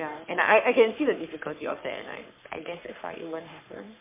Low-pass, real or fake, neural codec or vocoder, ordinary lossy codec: 3.6 kHz; fake; codec, 44.1 kHz, 3.4 kbps, Pupu-Codec; MP3, 24 kbps